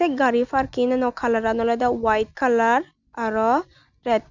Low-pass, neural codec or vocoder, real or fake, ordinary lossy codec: 7.2 kHz; none; real; Opus, 64 kbps